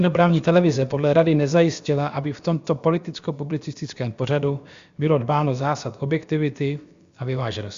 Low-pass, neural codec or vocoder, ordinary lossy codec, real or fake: 7.2 kHz; codec, 16 kHz, about 1 kbps, DyCAST, with the encoder's durations; Opus, 64 kbps; fake